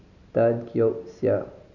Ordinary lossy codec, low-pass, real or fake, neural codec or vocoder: none; 7.2 kHz; real; none